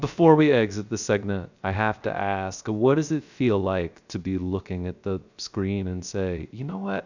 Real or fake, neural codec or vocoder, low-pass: fake; codec, 16 kHz, 0.3 kbps, FocalCodec; 7.2 kHz